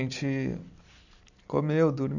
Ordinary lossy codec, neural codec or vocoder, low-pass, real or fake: none; none; 7.2 kHz; real